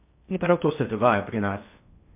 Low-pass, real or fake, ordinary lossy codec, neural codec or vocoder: 3.6 kHz; fake; MP3, 32 kbps; codec, 16 kHz in and 24 kHz out, 0.6 kbps, FocalCodec, streaming, 2048 codes